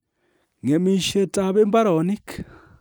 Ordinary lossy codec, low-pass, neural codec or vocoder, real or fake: none; none; none; real